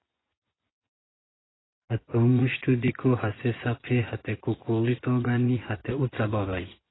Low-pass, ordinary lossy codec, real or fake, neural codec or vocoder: 7.2 kHz; AAC, 16 kbps; fake; vocoder, 24 kHz, 100 mel bands, Vocos